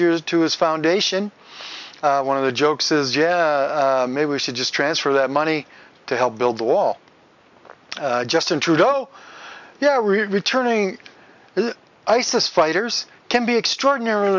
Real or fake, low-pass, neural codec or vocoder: real; 7.2 kHz; none